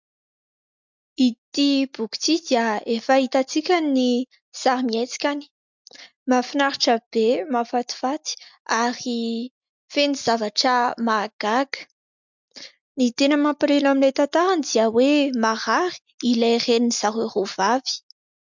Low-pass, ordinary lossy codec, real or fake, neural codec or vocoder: 7.2 kHz; MP3, 64 kbps; real; none